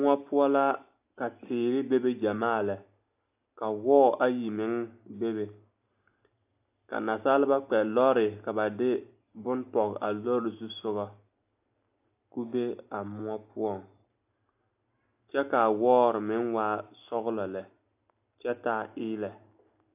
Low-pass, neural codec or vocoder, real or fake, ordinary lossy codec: 3.6 kHz; none; real; AAC, 32 kbps